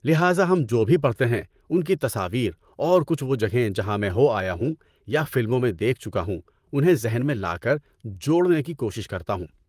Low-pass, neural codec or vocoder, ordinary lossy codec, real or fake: 14.4 kHz; vocoder, 44.1 kHz, 128 mel bands, Pupu-Vocoder; Opus, 32 kbps; fake